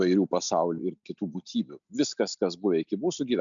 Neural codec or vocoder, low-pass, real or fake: none; 7.2 kHz; real